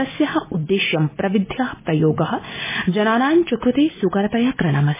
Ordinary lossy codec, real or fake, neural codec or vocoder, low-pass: MP3, 16 kbps; real; none; 3.6 kHz